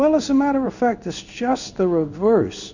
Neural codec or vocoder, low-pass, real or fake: codec, 16 kHz in and 24 kHz out, 1 kbps, XY-Tokenizer; 7.2 kHz; fake